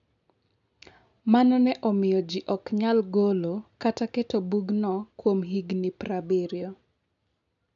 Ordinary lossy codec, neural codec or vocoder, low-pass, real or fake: none; none; 7.2 kHz; real